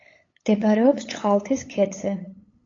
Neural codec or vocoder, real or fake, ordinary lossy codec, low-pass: codec, 16 kHz, 8 kbps, FunCodec, trained on LibriTTS, 25 frames a second; fake; AAC, 32 kbps; 7.2 kHz